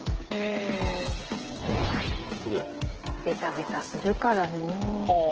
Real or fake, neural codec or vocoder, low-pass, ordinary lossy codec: fake; codec, 16 kHz, 8 kbps, FreqCodec, smaller model; 7.2 kHz; Opus, 16 kbps